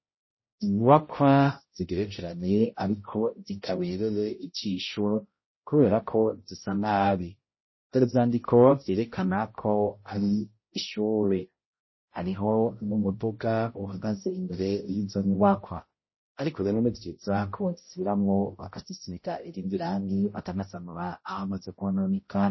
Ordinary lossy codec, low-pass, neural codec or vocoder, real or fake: MP3, 24 kbps; 7.2 kHz; codec, 16 kHz, 0.5 kbps, X-Codec, HuBERT features, trained on balanced general audio; fake